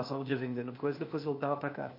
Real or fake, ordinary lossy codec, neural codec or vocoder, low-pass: fake; MP3, 32 kbps; codec, 16 kHz, 0.8 kbps, ZipCodec; 5.4 kHz